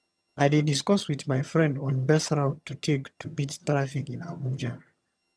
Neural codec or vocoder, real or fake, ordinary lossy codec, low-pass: vocoder, 22.05 kHz, 80 mel bands, HiFi-GAN; fake; none; none